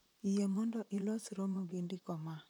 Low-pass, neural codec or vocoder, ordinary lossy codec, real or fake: 19.8 kHz; vocoder, 44.1 kHz, 128 mel bands, Pupu-Vocoder; none; fake